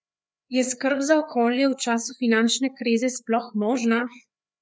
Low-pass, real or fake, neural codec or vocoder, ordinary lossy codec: none; fake; codec, 16 kHz, 4 kbps, FreqCodec, larger model; none